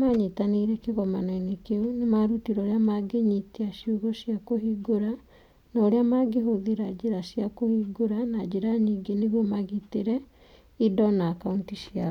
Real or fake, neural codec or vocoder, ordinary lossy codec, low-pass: real; none; none; 19.8 kHz